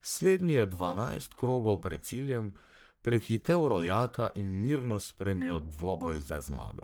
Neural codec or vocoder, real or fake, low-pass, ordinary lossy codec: codec, 44.1 kHz, 1.7 kbps, Pupu-Codec; fake; none; none